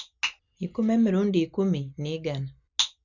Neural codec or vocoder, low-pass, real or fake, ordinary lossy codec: none; 7.2 kHz; real; none